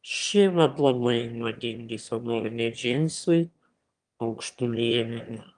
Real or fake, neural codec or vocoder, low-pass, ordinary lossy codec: fake; autoencoder, 22.05 kHz, a latent of 192 numbers a frame, VITS, trained on one speaker; 9.9 kHz; Opus, 32 kbps